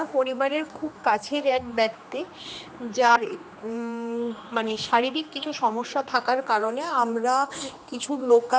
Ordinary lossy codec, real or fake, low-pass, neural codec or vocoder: none; fake; none; codec, 16 kHz, 2 kbps, X-Codec, HuBERT features, trained on general audio